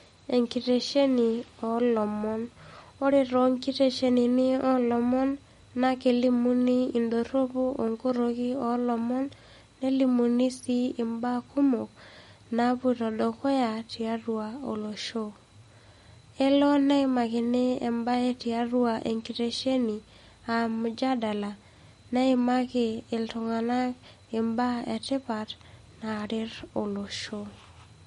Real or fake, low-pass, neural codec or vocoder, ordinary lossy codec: real; 19.8 kHz; none; MP3, 48 kbps